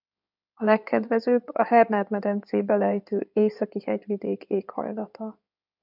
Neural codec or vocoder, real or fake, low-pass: codec, 16 kHz in and 24 kHz out, 2.2 kbps, FireRedTTS-2 codec; fake; 5.4 kHz